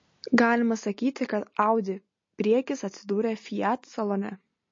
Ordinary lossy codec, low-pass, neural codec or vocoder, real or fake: MP3, 32 kbps; 7.2 kHz; none; real